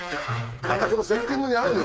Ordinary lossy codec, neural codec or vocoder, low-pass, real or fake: none; codec, 16 kHz, 4 kbps, FreqCodec, smaller model; none; fake